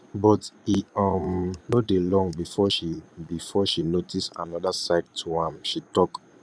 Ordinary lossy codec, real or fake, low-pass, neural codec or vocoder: none; fake; none; vocoder, 22.05 kHz, 80 mel bands, Vocos